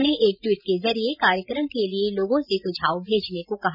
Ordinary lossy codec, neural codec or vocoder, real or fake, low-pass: none; none; real; 5.4 kHz